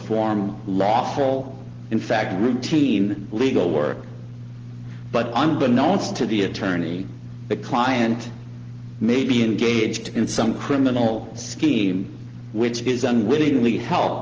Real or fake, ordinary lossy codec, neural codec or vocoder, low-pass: real; Opus, 16 kbps; none; 7.2 kHz